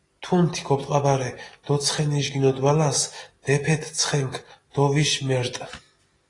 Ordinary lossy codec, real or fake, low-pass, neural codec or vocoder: AAC, 32 kbps; real; 10.8 kHz; none